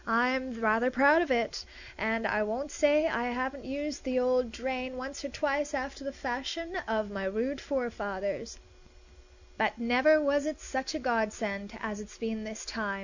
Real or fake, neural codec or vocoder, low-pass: real; none; 7.2 kHz